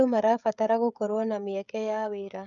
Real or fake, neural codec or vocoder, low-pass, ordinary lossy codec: fake; codec, 16 kHz, 16 kbps, FreqCodec, smaller model; 7.2 kHz; none